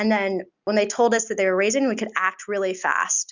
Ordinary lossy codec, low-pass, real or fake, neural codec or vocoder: Opus, 64 kbps; 7.2 kHz; real; none